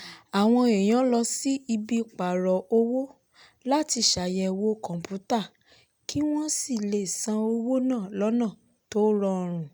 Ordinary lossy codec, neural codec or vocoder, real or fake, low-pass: none; none; real; none